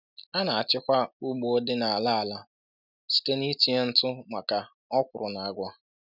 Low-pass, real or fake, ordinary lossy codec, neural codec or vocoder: 5.4 kHz; real; none; none